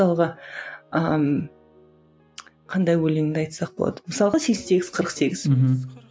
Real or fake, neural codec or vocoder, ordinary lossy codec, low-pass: real; none; none; none